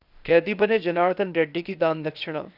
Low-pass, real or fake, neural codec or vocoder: 5.4 kHz; fake; codec, 16 kHz, 0.8 kbps, ZipCodec